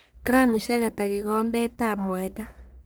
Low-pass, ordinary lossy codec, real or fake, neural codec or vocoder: none; none; fake; codec, 44.1 kHz, 1.7 kbps, Pupu-Codec